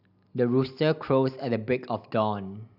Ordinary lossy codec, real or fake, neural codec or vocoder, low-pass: none; real; none; 5.4 kHz